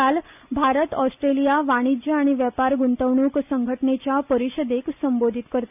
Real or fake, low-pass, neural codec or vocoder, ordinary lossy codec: real; 3.6 kHz; none; none